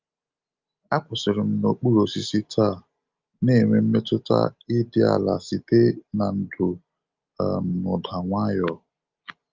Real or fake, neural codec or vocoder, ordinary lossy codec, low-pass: real; none; Opus, 24 kbps; 7.2 kHz